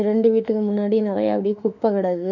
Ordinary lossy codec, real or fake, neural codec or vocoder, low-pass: none; fake; autoencoder, 48 kHz, 32 numbers a frame, DAC-VAE, trained on Japanese speech; 7.2 kHz